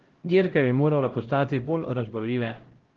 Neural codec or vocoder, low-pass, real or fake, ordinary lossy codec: codec, 16 kHz, 0.5 kbps, X-Codec, HuBERT features, trained on LibriSpeech; 7.2 kHz; fake; Opus, 16 kbps